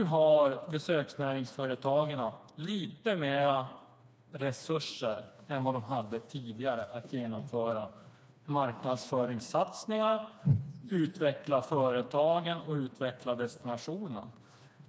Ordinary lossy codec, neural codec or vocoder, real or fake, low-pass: none; codec, 16 kHz, 2 kbps, FreqCodec, smaller model; fake; none